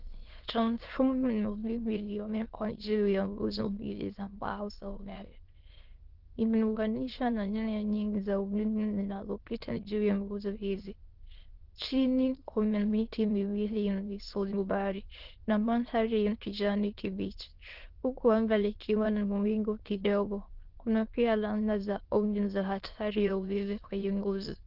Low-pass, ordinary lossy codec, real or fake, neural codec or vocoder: 5.4 kHz; Opus, 16 kbps; fake; autoencoder, 22.05 kHz, a latent of 192 numbers a frame, VITS, trained on many speakers